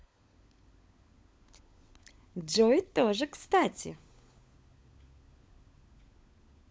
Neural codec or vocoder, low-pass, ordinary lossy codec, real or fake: codec, 16 kHz, 16 kbps, FunCodec, trained on LibriTTS, 50 frames a second; none; none; fake